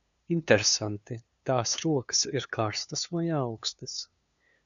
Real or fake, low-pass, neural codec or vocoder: fake; 7.2 kHz; codec, 16 kHz, 2 kbps, FunCodec, trained on LibriTTS, 25 frames a second